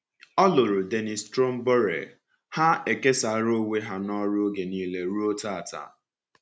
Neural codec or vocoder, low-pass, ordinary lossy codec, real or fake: none; none; none; real